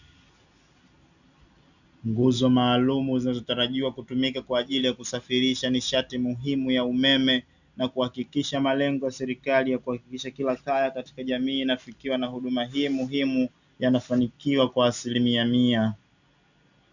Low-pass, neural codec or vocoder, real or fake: 7.2 kHz; none; real